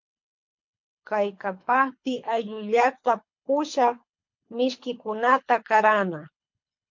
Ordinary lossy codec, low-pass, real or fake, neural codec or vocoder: MP3, 48 kbps; 7.2 kHz; fake; codec, 24 kHz, 3 kbps, HILCodec